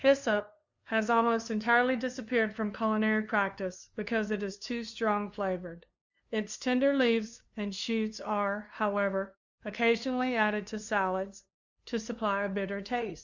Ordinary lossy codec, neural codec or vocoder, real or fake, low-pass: Opus, 64 kbps; codec, 16 kHz, 2 kbps, FunCodec, trained on LibriTTS, 25 frames a second; fake; 7.2 kHz